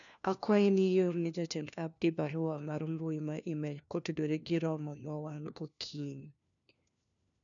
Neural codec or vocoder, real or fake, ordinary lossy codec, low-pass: codec, 16 kHz, 1 kbps, FunCodec, trained on LibriTTS, 50 frames a second; fake; none; 7.2 kHz